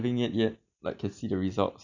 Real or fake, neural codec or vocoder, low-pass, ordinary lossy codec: real; none; 7.2 kHz; none